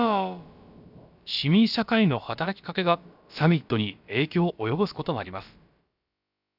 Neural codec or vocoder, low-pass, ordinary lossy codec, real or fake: codec, 16 kHz, about 1 kbps, DyCAST, with the encoder's durations; 5.4 kHz; none; fake